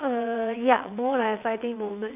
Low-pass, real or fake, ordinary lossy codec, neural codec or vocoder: 3.6 kHz; fake; none; vocoder, 22.05 kHz, 80 mel bands, WaveNeXt